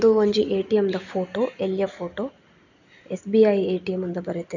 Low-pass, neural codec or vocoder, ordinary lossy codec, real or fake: 7.2 kHz; none; none; real